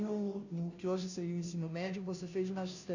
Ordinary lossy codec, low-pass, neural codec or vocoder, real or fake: none; 7.2 kHz; codec, 16 kHz, 0.5 kbps, FunCodec, trained on Chinese and English, 25 frames a second; fake